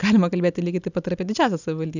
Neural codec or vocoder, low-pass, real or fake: none; 7.2 kHz; real